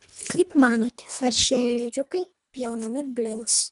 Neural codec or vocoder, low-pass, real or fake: codec, 24 kHz, 1.5 kbps, HILCodec; 10.8 kHz; fake